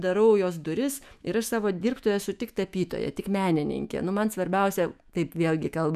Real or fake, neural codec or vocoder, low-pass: fake; autoencoder, 48 kHz, 128 numbers a frame, DAC-VAE, trained on Japanese speech; 14.4 kHz